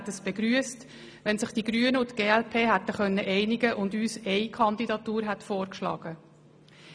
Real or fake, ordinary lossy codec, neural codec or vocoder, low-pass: real; none; none; 9.9 kHz